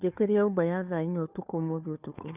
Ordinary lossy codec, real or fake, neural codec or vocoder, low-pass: none; fake; codec, 16 kHz, 2 kbps, FunCodec, trained on Chinese and English, 25 frames a second; 3.6 kHz